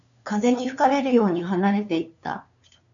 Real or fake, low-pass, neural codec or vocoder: fake; 7.2 kHz; codec, 16 kHz, 2 kbps, FunCodec, trained on LibriTTS, 25 frames a second